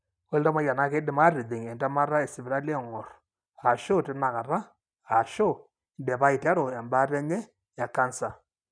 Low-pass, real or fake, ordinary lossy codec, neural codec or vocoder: 9.9 kHz; real; none; none